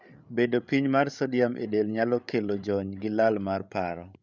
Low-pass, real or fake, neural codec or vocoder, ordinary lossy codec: 7.2 kHz; fake; codec, 16 kHz, 8 kbps, FreqCodec, larger model; none